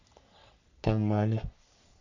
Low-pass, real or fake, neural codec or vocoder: 7.2 kHz; fake; codec, 44.1 kHz, 3.4 kbps, Pupu-Codec